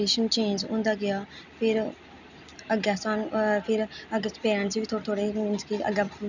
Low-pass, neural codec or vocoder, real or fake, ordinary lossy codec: 7.2 kHz; none; real; none